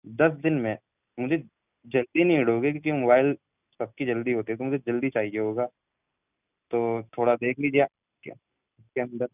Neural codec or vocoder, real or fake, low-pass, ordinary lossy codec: none; real; 3.6 kHz; none